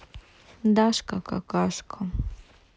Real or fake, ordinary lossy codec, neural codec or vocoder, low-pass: real; none; none; none